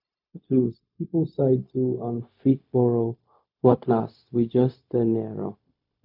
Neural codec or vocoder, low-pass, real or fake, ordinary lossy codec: codec, 16 kHz, 0.4 kbps, LongCat-Audio-Codec; 5.4 kHz; fake; none